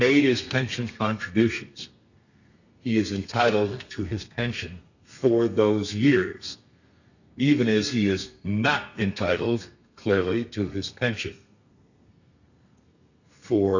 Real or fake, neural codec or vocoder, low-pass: fake; codec, 32 kHz, 1.9 kbps, SNAC; 7.2 kHz